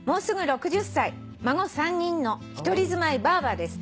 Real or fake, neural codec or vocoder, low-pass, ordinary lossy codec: real; none; none; none